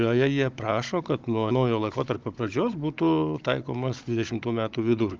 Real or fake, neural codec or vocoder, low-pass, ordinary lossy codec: fake; codec, 16 kHz, 16 kbps, FunCodec, trained on Chinese and English, 50 frames a second; 7.2 kHz; Opus, 24 kbps